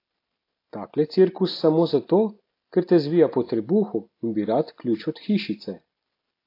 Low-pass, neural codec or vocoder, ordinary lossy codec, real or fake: 5.4 kHz; none; AAC, 32 kbps; real